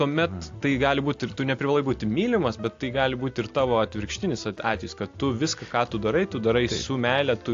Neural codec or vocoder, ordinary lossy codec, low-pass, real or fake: none; AAC, 48 kbps; 7.2 kHz; real